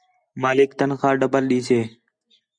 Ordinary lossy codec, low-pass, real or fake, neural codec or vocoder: Opus, 64 kbps; 9.9 kHz; real; none